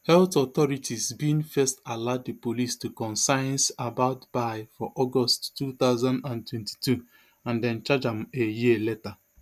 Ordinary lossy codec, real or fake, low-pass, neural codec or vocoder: none; real; 14.4 kHz; none